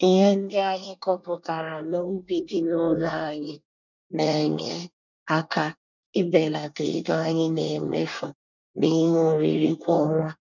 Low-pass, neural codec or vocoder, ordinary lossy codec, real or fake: 7.2 kHz; codec, 24 kHz, 1 kbps, SNAC; none; fake